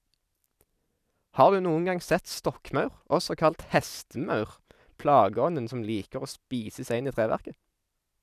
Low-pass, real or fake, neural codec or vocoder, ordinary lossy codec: 14.4 kHz; fake; vocoder, 44.1 kHz, 128 mel bands every 256 samples, BigVGAN v2; none